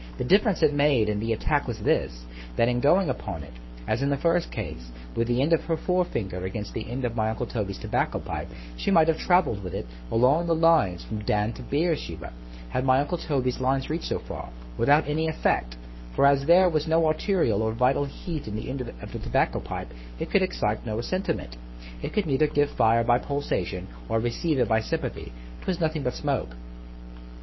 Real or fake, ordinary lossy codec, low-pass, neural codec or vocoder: fake; MP3, 24 kbps; 7.2 kHz; codec, 44.1 kHz, 7.8 kbps, Pupu-Codec